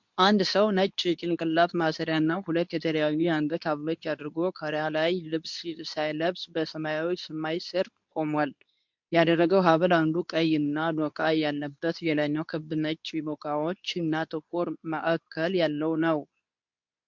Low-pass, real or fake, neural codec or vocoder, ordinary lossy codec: 7.2 kHz; fake; codec, 24 kHz, 0.9 kbps, WavTokenizer, medium speech release version 2; MP3, 64 kbps